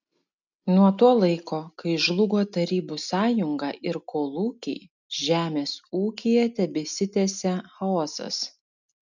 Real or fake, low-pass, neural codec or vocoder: real; 7.2 kHz; none